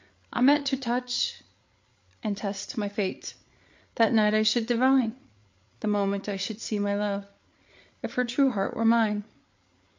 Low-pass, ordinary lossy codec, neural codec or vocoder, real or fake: 7.2 kHz; MP3, 48 kbps; codec, 16 kHz, 8 kbps, FreqCodec, larger model; fake